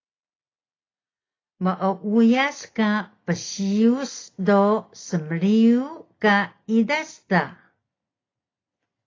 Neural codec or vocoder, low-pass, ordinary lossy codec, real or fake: none; 7.2 kHz; AAC, 32 kbps; real